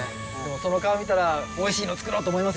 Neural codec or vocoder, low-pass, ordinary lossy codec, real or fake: none; none; none; real